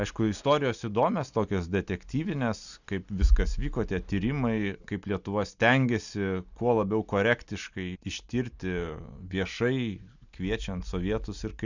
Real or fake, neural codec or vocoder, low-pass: real; none; 7.2 kHz